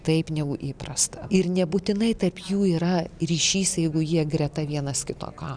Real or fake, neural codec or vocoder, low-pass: real; none; 9.9 kHz